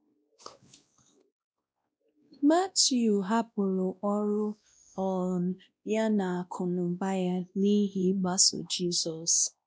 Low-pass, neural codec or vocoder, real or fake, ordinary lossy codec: none; codec, 16 kHz, 1 kbps, X-Codec, WavLM features, trained on Multilingual LibriSpeech; fake; none